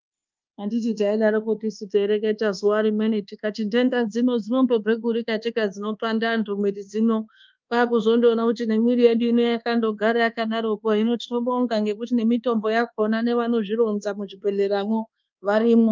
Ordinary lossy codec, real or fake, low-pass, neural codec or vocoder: Opus, 24 kbps; fake; 7.2 kHz; codec, 24 kHz, 1.2 kbps, DualCodec